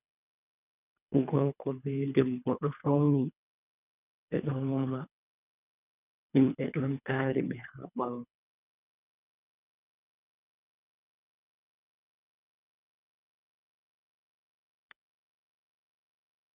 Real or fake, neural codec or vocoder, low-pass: fake; codec, 24 kHz, 3 kbps, HILCodec; 3.6 kHz